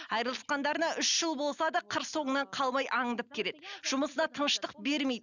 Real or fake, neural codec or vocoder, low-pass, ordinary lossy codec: real; none; 7.2 kHz; none